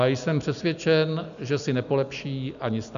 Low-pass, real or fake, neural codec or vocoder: 7.2 kHz; real; none